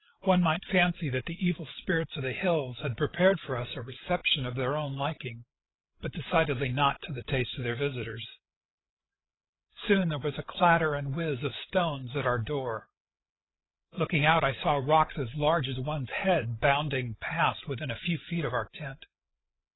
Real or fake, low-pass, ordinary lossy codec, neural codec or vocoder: fake; 7.2 kHz; AAC, 16 kbps; codec, 16 kHz, 16 kbps, FreqCodec, larger model